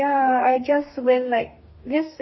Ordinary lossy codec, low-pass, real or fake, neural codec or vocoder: MP3, 24 kbps; 7.2 kHz; fake; codec, 44.1 kHz, 2.6 kbps, DAC